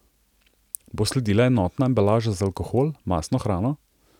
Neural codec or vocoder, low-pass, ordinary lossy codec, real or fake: none; 19.8 kHz; none; real